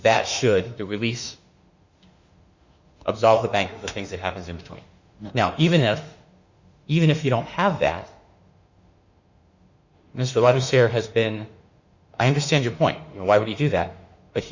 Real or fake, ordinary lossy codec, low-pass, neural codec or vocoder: fake; Opus, 64 kbps; 7.2 kHz; autoencoder, 48 kHz, 32 numbers a frame, DAC-VAE, trained on Japanese speech